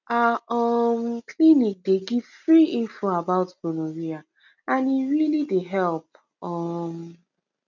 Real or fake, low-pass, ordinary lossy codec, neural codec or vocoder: real; 7.2 kHz; none; none